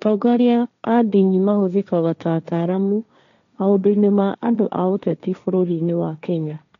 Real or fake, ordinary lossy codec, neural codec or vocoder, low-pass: fake; none; codec, 16 kHz, 1.1 kbps, Voila-Tokenizer; 7.2 kHz